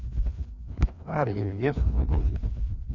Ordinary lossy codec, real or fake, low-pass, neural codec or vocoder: none; fake; 7.2 kHz; codec, 16 kHz, 2 kbps, FreqCodec, larger model